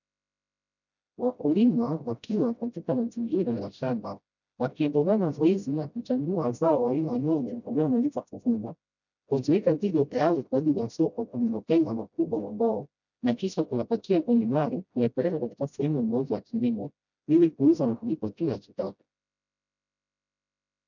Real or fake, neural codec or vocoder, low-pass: fake; codec, 16 kHz, 0.5 kbps, FreqCodec, smaller model; 7.2 kHz